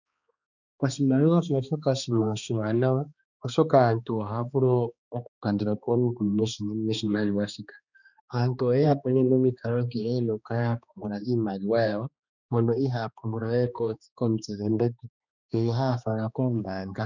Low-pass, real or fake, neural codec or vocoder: 7.2 kHz; fake; codec, 16 kHz, 2 kbps, X-Codec, HuBERT features, trained on balanced general audio